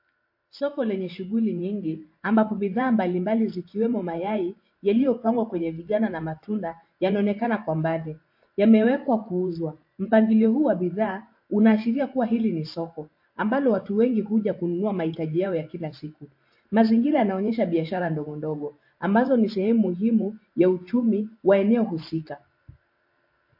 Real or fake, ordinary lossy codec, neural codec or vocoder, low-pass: fake; MP3, 32 kbps; vocoder, 44.1 kHz, 128 mel bands every 512 samples, BigVGAN v2; 5.4 kHz